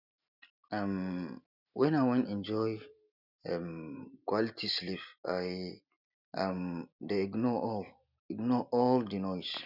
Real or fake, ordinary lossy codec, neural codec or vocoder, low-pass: real; none; none; 5.4 kHz